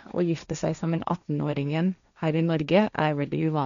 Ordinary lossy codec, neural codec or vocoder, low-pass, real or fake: none; codec, 16 kHz, 1.1 kbps, Voila-Tokenizer; 7.2 kHz; fake